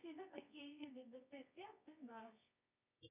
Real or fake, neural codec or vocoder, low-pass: fake; codec, 24 kHz, 0.9 kbps, WavTokenizer, medium music audio release; 3.6 kHz